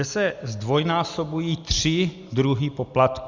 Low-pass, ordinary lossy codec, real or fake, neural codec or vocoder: 7.2 kHz; Opus, 64 kbps; real; none